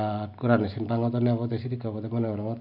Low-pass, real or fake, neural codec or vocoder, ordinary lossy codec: 5.4 kHz; real; none; none